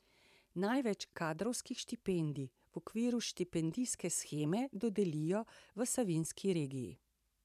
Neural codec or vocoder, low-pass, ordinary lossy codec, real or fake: vocoder, 44.1 kHz, 128 mel bands every 512 samples, BigVGAN v2; 14.4 kHz; none; fake